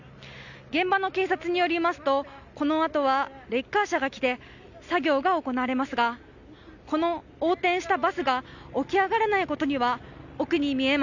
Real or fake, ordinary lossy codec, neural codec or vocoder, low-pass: real; none; none; 7.2 kHz